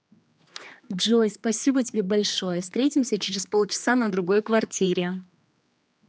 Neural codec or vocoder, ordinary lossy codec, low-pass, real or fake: codec, 16 kHz, 2 kbps, X-Codec, HuBERT features, trained on general audio; none; none; fake